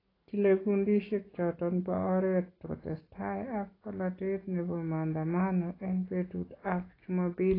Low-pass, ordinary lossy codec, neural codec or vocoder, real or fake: 5.4 kHz; AAC, 24 kbps; none; real